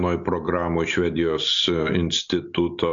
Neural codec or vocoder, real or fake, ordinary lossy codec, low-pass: none; real; AAC, 64 kbps; 7.2 kHz